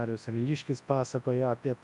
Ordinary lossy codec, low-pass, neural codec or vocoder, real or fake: MP3, 64 kbps; 10.8 kHz; codec, 24 kHz, 0.9 kbps, WavTokenizer, large speech release; fake